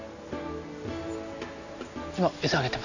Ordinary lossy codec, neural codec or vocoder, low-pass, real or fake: none; none; 7.2 kHz; real